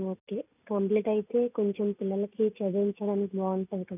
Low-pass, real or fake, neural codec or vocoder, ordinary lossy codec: 3.6 kHz; real; none; none